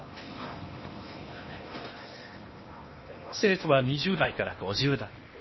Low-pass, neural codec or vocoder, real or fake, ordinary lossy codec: 7.2 kHz; codec, 16 kHz in and 24 kHz out, 0.8 kbps, FocalCodec, streaming, 65536 codes; fake; MP3, 24 kbps